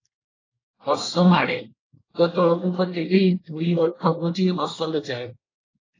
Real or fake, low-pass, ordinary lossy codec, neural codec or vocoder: fake; 7.2 kHz; AAC, 32 kbps; codec, 24 kHz, 1 kbps, SNAC